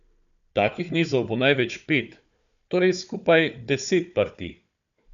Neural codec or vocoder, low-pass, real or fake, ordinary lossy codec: codec, 16 kHz, 4 kbps, FunCodec, trained on Chinese and English, 50 frames a second; 7.2 kHz; fake; none